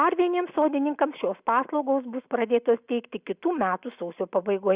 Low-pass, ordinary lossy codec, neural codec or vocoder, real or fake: 3.6 kHz; Opus, 64 kbps; none; real